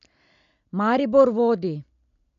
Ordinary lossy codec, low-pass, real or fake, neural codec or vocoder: none; 7.2 kHz; real; none